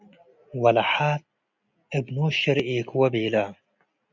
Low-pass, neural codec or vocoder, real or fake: 7.2 kHz; none; real